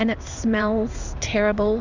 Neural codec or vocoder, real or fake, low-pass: codec, 16 kHz in and 24 kHz out, 1 kbps, XY-Tokenizer; fake; 7.2 kHz